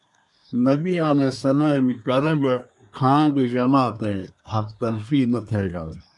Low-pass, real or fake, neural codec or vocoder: 10.8 kHz; fake; codec, 24 kHz, 1 kbps, SNAC